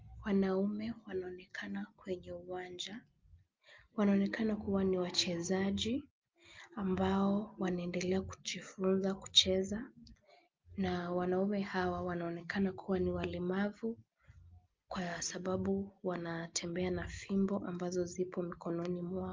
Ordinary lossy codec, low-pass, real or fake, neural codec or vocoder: Opus, 32 kbps; 7.2 kHz; real; none